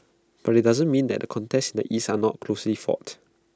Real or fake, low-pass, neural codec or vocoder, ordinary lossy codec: real; none; none; none